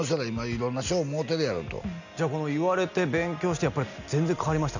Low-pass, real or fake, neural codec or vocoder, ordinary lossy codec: 7.2 kHz; real; none; none